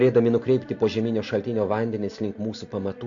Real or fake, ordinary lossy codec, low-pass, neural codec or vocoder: real; AAC, 64 kbps; 7.2 kHz; none